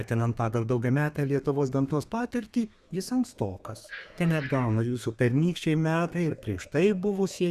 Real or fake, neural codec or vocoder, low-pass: fake; codec, 32 kHz, 1.9 kbps, SNAC; 14.4 kHz